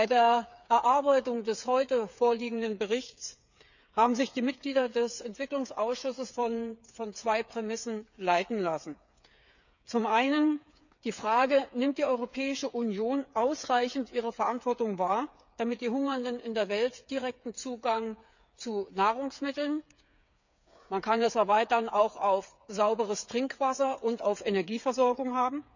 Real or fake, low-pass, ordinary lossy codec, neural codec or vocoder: fake; 7.2 kHz; none; codec, 16 kHz, 8 kbps, FreqCodec, smaller model